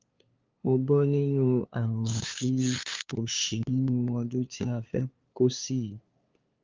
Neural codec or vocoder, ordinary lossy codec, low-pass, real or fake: codec, 16 kHz, 2 kbps, FunCodec, trained on LibriTTS, 25 frames a second; Opus, 32 kbps; 7.2 kHz; fake